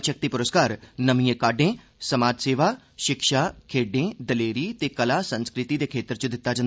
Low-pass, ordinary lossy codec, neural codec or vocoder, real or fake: none; none; none; real